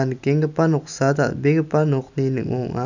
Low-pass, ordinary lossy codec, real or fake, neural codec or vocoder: 7.2 kHz; none; real; none